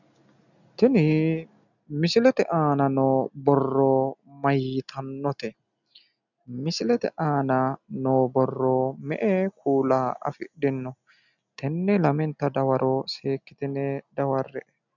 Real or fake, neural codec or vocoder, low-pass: real; none; 7.2 kHz